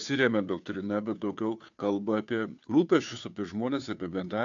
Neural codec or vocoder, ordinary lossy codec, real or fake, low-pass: codec, 16 kHz, 2 kbps, FunCodec, trained on Chinese and English, 25 frames a second; AAC, 64 kbps; fake; 7.2 kHz